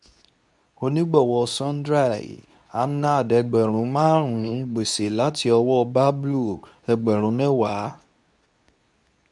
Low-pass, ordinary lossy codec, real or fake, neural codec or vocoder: 10.8 kHz; none; fake; codec, 24 kHz, 0.9 kbps, WavTokenizer, medium speech release version 2